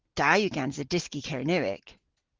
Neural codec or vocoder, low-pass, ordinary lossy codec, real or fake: none; 7.2 kHz; Opus, 16 kbps; real